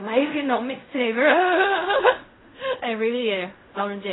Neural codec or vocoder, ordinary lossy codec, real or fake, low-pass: codec, 16 kHz in and 24 kHz out, 0.4 kbps, LongCat-Audio-Codec, fine tuned four codebook decoder; AAC, 16 kbps; fake; 7.2 kHz